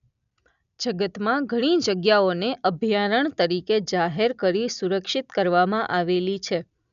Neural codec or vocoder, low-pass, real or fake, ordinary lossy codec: none; 7.2 kHz; real; none